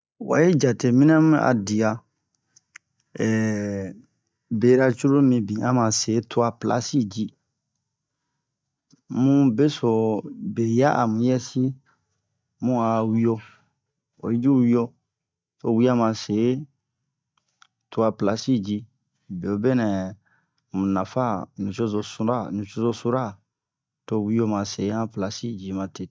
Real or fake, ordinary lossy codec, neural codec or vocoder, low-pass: real; none; none; none